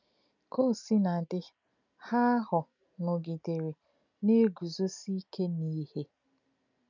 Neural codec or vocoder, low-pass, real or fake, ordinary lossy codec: none; 7.2 kHz; real; none